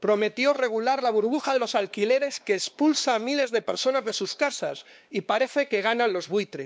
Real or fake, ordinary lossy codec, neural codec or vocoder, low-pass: fake; none; codec, 16 kHz, 2 kbps, X-Codec, WavLM features, trained on Multilingual LibriSpeech; none